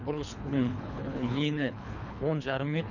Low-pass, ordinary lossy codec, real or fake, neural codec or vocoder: 7.2 kHz; none; fake; codec, 24 kHz, 3 kbps, HILCodec